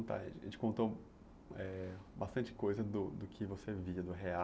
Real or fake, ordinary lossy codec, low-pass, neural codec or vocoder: real; none; none; none